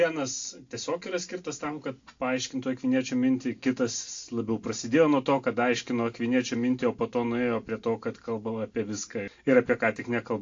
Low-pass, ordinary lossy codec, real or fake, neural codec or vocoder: 7.2 kHz; AAC, 48 kbps; real; none